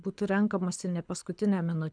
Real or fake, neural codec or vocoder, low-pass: fake; codec, 24 kHz, 6 kbps, HILCodec; 9.9 kHz